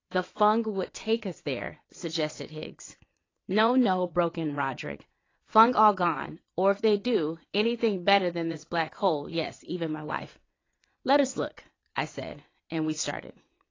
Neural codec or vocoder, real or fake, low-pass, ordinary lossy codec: vocoder, 22.05 kHz, 80 mel bands, WaveNeXt; fake; 7.2 kHz; AAC, 32 kbps